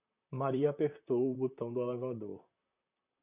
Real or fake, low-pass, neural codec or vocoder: fake; 3.6 kHz; vocoder, 44.1 kHz, 128 mel bands, Pupu-Vocoder